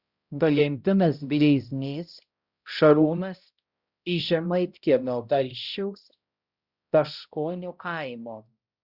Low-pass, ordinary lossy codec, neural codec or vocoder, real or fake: 5.4 kHz; Opus, 64 kbps; codec, 16 kHz, 0.5 kbps, X-Codec, HuBERT features, trained on balanced general audio; fake